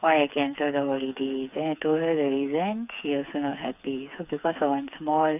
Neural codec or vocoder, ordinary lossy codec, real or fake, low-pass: codec, 16 kHz, 4 kbps, FreqCodec, smaller model; none; fake; 3.6 kHz